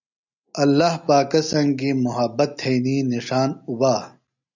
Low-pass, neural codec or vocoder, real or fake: 7.2 kHz; none; real